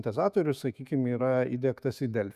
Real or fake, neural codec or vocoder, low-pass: fake; autoencoder, 48 kHz, 128 numbers a frame, DAC-VAE, trained on Japanese speech; 14.4 kHz